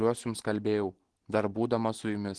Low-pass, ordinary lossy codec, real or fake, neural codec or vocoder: 10.8 kHz; Opus, 16 kbps; real; none